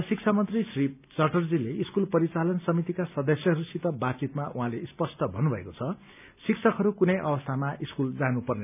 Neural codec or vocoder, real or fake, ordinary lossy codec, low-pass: none; real; none; 3.6 kHz